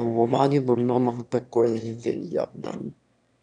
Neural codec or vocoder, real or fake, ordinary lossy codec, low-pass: autoencoder, 22.05 kHz, a latent of 192 numbers a frame, VITS, trained on one speaker; fake; none; 9.9 kHz